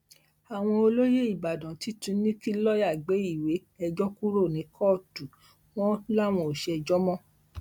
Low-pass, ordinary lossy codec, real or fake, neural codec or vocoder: 19.8 kHz; MP3, 96 kbps; real; none